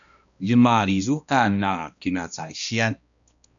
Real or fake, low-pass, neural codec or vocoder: fake; 7.2 kHz; codec, 16 kHz, 1 kbps, X-Codec, HuBERT features, trained on balanced general audio